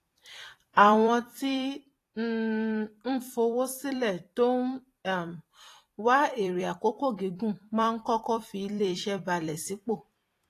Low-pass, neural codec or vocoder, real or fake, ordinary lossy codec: 14.4 kHz; vocoder, 44.1 kHz, 128 mel bands every 256 samples, BigVGAN v2; fake; AAC, 48 kbps